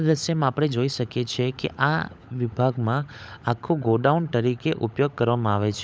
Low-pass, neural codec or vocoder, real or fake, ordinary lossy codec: none; codec, 16 kHz, 16 kbps, FunCodec, trained on LibriTTS, 50 frames a second; fake; none